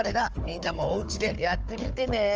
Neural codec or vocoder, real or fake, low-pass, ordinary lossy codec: codec, 16 kHz, 4 kbps, FunCodec, trained on Chinese and English, 50 frames a second; fake; 7.2 kHz; Opus, 24 kbps